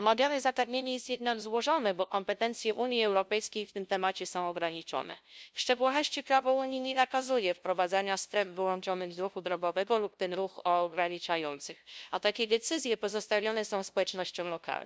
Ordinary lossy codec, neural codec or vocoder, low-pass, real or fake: none; codec, 16 kHz, 0.5 kbps, FunCodec, trained on LibriTTS, 25 frames a second; none; fake